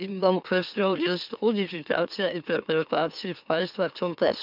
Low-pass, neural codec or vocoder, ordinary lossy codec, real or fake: 5.4 kHz; autoencoder, 44.1 kHz, a latent of 192 numbers a frame, MeloTTS; none; fake